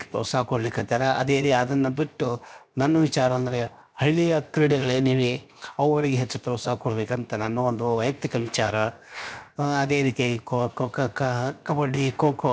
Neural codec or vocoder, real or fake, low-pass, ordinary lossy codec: codec, 16 kHz, 0.7 kbps, FocalCodec; fake; none; none